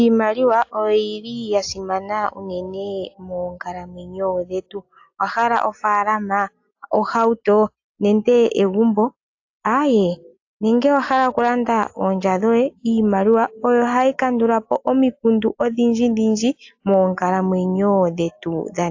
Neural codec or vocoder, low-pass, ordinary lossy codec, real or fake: none; 7.2 kHz; AAC, 48 kbps; real